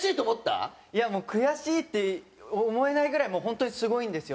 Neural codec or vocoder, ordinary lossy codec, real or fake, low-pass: none; none; real; none